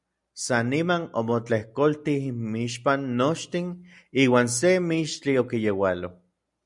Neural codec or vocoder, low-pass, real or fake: none; 10.8 kHz; real